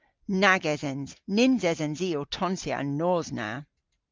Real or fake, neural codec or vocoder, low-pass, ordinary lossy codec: real; none; 7.2 kHz; Opus, 24 kbps